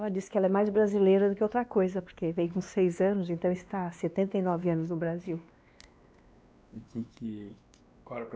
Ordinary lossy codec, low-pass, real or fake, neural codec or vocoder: none; none; fake; codec, 16 kHz, 2 kbps, X-Codec, WavLM features, trained on Multilingual LibriSpeech